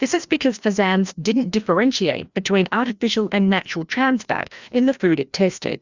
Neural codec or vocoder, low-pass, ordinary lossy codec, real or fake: codec, 16 kHz, 1 kbps, FreqCodec, larger model; 7.2 kHz; Opus, 64 kbps; fake